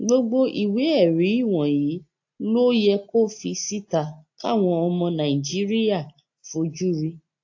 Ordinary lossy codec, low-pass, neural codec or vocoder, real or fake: AAC, 48 kbps; 7.2 kHz; none; real